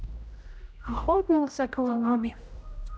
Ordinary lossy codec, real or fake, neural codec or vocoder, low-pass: none; fake; codec, 16 kHz, 0.5 kbps, X-Codec, HuBERT features, trained on general audio; none